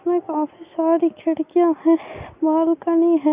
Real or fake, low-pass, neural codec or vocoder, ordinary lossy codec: real; 3.6 kHz; none; Opus, 64 kbps